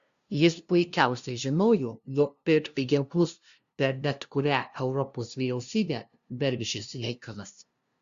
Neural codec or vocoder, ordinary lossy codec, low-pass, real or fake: codec, 16 kHz, 0.5 kbps, FunCodec, trained on LibriTTS, 25 frames a second; Opus, 64 kbps; 7.2 kHz; fake